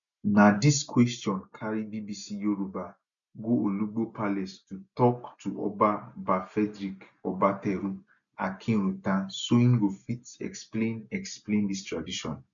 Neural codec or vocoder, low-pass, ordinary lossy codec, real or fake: none; 7.2 kHz; none; real